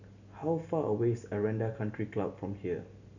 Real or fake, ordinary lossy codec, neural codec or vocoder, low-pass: real; none; none; 7.2 kHz